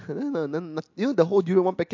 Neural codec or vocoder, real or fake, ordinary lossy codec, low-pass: none; real; MP3, 48 kbps; 7.2 kHz